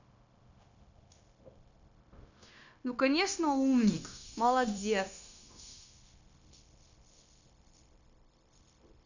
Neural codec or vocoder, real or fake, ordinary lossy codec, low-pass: codec, 16 kHz, 0.9 kbps, LongCat-Audio-Codec; fake; none; 7.2 kHz